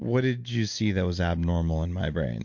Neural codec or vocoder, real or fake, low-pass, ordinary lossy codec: none; real; 7.2 kHz; MP3, 48 kbps